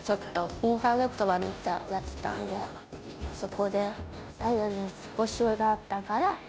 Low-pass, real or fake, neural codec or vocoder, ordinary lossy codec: none; fake; codec, 16 kHz, 0.5 kbps, FunCodec, trained on Chinese and English, 25 frames a second; none